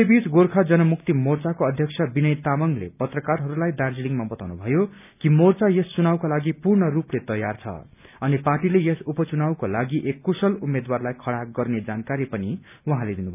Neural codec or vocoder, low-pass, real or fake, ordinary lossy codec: none; 3.6 kHz; real; none